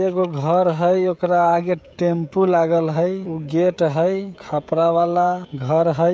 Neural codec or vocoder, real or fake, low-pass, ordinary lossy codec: codec, 16 kHz, 16 kbps, FreqCodec, smaller model; fake; none; none